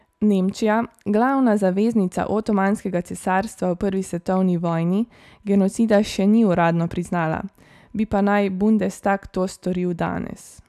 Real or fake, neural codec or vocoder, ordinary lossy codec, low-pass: real; none; none; 14.4 kHz